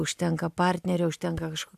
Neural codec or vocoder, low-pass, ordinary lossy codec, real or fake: none; 14.4 kHz; AAC, 96 kbps; real